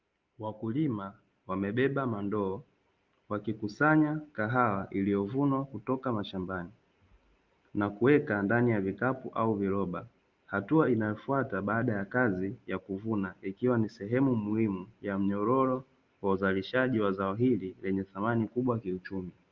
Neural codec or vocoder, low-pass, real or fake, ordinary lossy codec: none; 7.2 kHz; real; Opus, 32 kbps